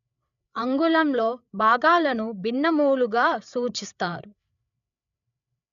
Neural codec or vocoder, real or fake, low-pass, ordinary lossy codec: codec, 16 kHz, 8 kbps, FreqCodec, larger model; fake; 7.2 kHz; none